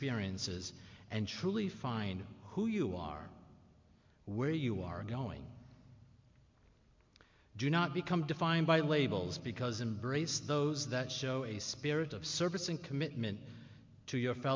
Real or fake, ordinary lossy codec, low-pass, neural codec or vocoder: real; MP3, 48 kbps; 7.2 kHz; none